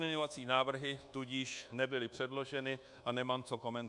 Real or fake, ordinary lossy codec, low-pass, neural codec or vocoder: fake; MP3, 96 kbps; 10.8 kHz; codec, 24 kHz, 1.2 kbps, DualCodec